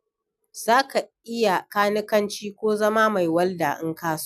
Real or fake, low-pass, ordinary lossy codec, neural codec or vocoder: real; 14.4 kHz; none; none